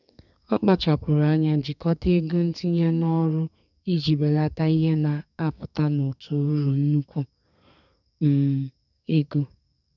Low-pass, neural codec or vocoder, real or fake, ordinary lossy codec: 7.2 kHz; codec, 32 kHz, 1.9 kbps, SNAC; fake; none